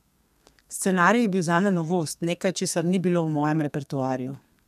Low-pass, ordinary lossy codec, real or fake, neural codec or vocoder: 14.4 kHz; none; fake; codec, 32 kHz, 1.9 kbps, SNAC